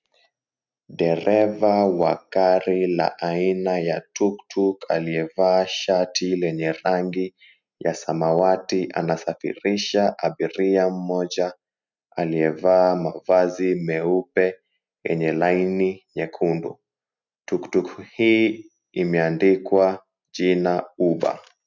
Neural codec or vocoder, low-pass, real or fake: none; 7.2 kHz; real